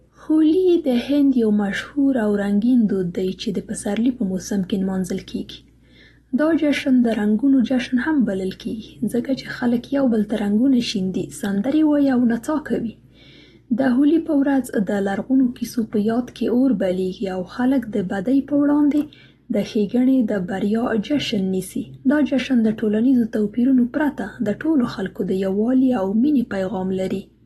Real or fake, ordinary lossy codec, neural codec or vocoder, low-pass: real; AAC, 32 kbps; none; 19.8 kHz